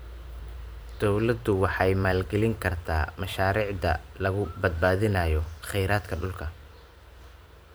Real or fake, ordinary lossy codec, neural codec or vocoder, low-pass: fake; none; vocoder, 44.1 kHz, 128 mel bands every 512 samples, BigVGAN v2; none